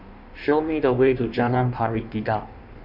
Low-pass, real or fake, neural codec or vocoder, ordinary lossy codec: 5.4 kHz; fake; codec, 16 kHz in and 24 kHz out, 1.1 kbps, FireRedTTS-2 codec; none